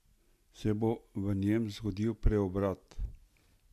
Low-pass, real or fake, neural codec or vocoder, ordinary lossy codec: 14.4 kHz; real; none; MP3, 64 kbps